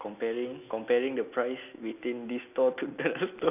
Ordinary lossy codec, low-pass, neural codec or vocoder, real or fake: none; 3.6 kHz; none; real